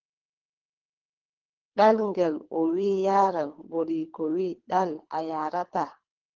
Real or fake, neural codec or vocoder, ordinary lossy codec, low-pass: fake; codec, 24 kHz, 3 kbps, HILCodec; Opus, 16 kbps; 7.2 kHz